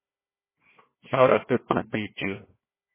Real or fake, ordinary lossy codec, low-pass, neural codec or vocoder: fake; MP3, 16 kbps; 3.6 kHz; codec, 16 kHz, 1 kbps, FunCodec, trained on Chinese and English, 50 frames a second